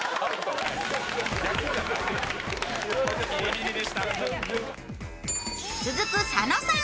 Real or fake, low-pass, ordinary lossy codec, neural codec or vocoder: real; none; none; none